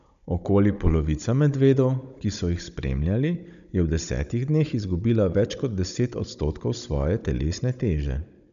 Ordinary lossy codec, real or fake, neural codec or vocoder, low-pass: none; fake; codec, 16 kHz, 16 kbps, FunCodec, trained on Chinese and English, 50 frames a second; 7.2 kHz